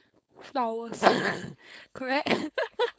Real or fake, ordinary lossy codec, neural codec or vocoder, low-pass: fake; none; codec, 16 kHz, 4.8 kbps, FACodec; none